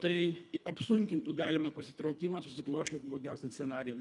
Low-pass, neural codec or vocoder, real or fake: 10.8 kHz; codec, 24 kHz, 1.5 kbps, HILCodec; fake